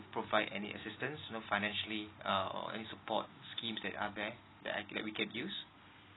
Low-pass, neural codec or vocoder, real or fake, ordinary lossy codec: 7.2 kHz; none; real; AAC, 16 kbps